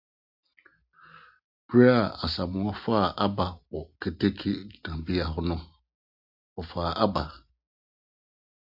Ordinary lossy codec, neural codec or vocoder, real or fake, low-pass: AAC, 48 kbps; none; real; 5.4 kHz